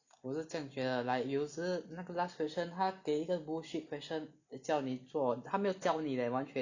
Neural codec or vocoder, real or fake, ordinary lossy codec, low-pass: none; real; none; 7.2 kHz